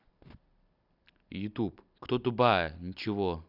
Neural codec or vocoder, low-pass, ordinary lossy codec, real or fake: none; 5.4 kHz; none; real